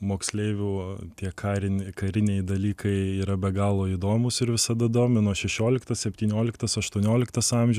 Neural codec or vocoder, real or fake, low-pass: none; real; 14.4 kHz